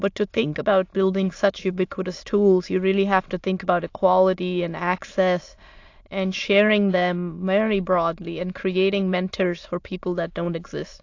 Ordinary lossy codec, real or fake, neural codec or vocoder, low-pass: AAC, 48 kbps; fake; autoencoder, 22.05 kHz, a latent of 192 numbers a frame, VITS, trained on many speakers; 7.2 kHz